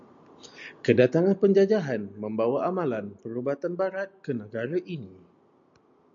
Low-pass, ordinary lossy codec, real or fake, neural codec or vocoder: 7.2 kHz; MP3, 64 kbps; real; none